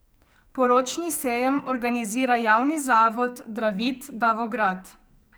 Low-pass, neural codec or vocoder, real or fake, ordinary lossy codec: none; codec, 44.1 kHz, 2.6 kbps, SNAC; fake; none